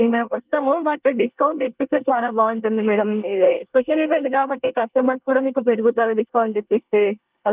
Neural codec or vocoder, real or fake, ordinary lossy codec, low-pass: codec, 24 kHz, 1 kbps, SNAC; fake; Opus, 32 kbps; 3.6 kHz